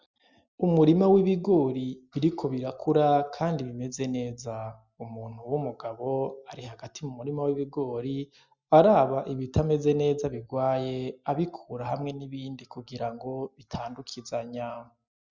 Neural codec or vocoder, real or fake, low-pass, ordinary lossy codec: none; real; 7.2 kHz; Opus, 64 kbps